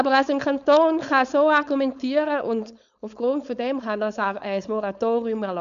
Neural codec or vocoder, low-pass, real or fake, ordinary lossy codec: codec, 16 kHz, 4.8 kbps, FACodec; 7.2 kHz; fake; none